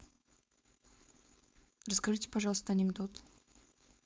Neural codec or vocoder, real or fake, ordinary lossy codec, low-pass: codec, 16 kHz, 4.8 kbps, FACodec; fake; none; none